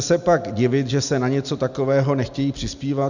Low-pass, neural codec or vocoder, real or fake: 7.2 kHz; none; real